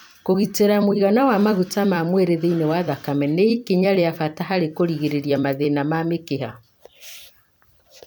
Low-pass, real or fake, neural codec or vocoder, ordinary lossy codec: none; fake; vocoder, 44.1 kHz, 128 mel bands every 256 samples, BigVGAN v2; none